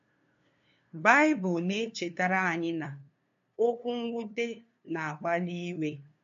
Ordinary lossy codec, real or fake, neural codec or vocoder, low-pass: MP3, 48 kbps; fake; codec, 16 kHz, 2 kbps, FunCodec, trained on LibriTTS, 25 frames a second; 7.2 kHz